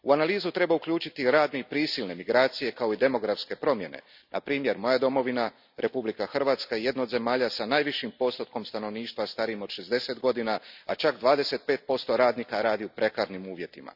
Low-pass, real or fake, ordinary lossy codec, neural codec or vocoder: 5.4 kHz; real; none; none